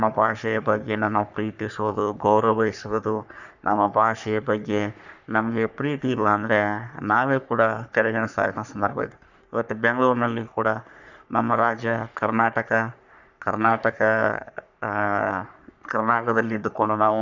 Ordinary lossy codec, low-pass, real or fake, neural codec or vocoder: none; 7.2 kHz; fake; codec, 44.1 kHz, 3.4 kbps, Pupu-Codec